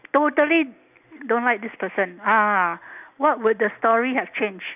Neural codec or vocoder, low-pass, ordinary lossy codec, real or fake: none; 3.6 kHz; none; real